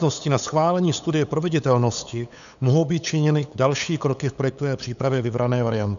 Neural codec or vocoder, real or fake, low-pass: codec, 16 kHz, 8 kbps, FunCodec, trained on LibriTTS, 25 frames a second; fake; 7.2 kHz